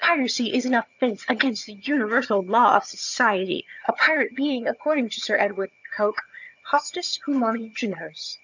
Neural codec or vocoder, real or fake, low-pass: vocoder, 22.05 kHz, 80 mel bands, HiFi-GAN; fake; 7.2 kHz